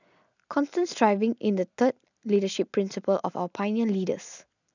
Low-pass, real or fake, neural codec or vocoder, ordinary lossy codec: 7.2 kHz; real; none; none